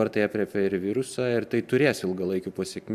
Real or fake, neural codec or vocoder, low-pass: real; none; 14.4 kHz